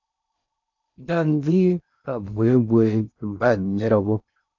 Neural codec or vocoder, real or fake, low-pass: codec, 16 kHz in and 24 kHz out, 0.6 kbps, FocalCodec, streaming, 4096 codes; fake; 7.2 kHz